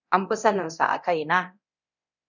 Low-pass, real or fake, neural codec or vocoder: 7.2 kHz; fake; codec, 24 kHz, 0.9 kbps, DualCodec